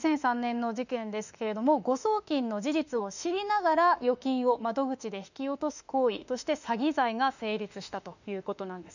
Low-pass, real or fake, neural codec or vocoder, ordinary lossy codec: 7.2 kHz; fake; autoencoder, 48 kHz, 32 numbers a frame, DAC-VAE, trained on Japanese speech; none